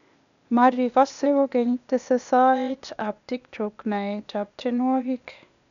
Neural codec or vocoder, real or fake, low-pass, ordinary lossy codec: codec, 16 kHz, 0.8 kbps, ZipCodec; fake; 7.2 kHz; none